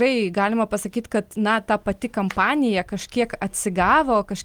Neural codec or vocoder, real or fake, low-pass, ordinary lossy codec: none; real; 19.8 kHz; Opus, 24 kbps